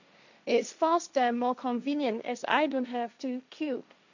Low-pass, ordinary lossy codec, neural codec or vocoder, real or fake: 7.2 kHz; none; codec, 16 kHz, 1.1 kbps, Voila-Tokenizer; fake